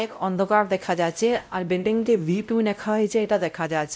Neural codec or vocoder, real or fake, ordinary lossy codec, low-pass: codec, 16 kHz, 0.5 kbps, X-Codec, WavLM features, trained on Multilingual LibriSpeech; fake; none; none